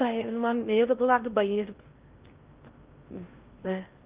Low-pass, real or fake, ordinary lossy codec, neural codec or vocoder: 3.6 kHz; fake; Opus, 32 kbps; codec, 16 kHz in and 24 kHz out, 0.6 kbps, FocalCodec, streaming, 4096 codes